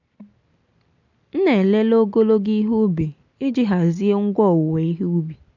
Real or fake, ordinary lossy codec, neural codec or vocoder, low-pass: real; none; none; 7.2 kHz